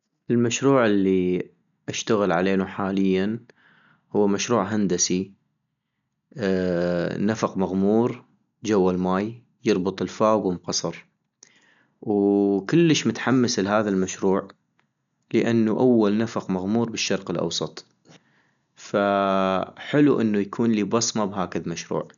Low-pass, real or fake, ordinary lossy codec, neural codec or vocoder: 7.2 kHz; real; none; none